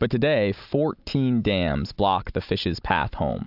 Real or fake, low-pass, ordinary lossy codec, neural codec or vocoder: real; 5.4 kHz; AAC, 48 kbps; none